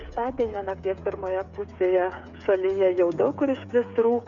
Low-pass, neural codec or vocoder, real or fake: 7.2 kHz; codec, 16 kHz, 8 kbps, FreqCodec, smaller model; fake